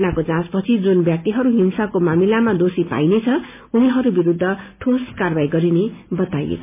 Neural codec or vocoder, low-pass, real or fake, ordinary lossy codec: none; 3.6 kHz; real; MP3, 32 kbps